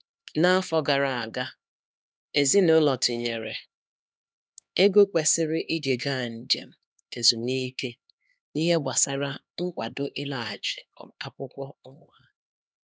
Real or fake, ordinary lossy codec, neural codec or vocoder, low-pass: fake; none; codec, 16 kHz, 2 kbps, X-Codec, HuBERT features, trained on LibriSpeech; none